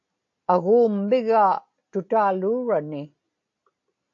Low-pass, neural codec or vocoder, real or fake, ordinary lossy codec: 7.2 kHz; none; real; AAC, 48 kbps